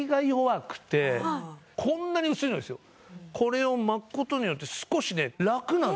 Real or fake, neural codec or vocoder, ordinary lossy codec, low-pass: real; none; none; none